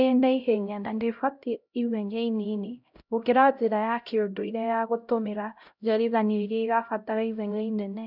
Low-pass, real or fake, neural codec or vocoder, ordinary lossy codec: 5.4 kHz; fake; codec, 16 kHz, 0.5 kbps, X-Codec, HuBERT features, trained on LibriSpeech; none